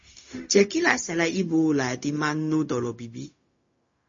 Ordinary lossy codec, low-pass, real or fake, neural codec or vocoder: MP3, 32 kbps; 7.2 kHz; fake; codec, 16 kHz, 0.4 kbps, LongCat-Audio-Codec